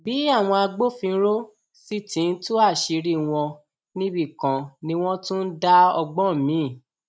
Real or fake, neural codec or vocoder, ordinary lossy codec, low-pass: real; none; none; none